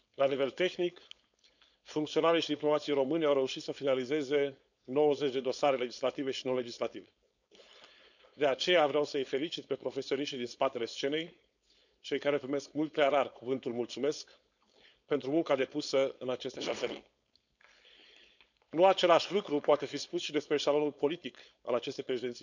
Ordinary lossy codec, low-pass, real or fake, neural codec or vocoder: none; 7.2 kHz; fake; codec, 16 kHz, 4.8 kbps, FACodec